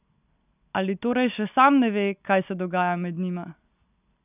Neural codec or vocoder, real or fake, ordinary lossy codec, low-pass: none; real; none; 3.6 kHz